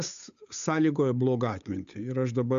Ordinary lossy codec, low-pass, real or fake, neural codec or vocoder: AAC, 96 kbps; 7.2 kHz; fake; codec, 16 kHz, 8 kbps, FunCodec, trained on Chinese and English, 25 frames a second